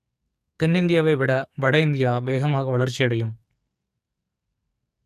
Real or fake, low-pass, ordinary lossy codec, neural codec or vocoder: fake; 14.4 kHz; none; codec, 44.1 kHz, 2.6 kbps, SNAC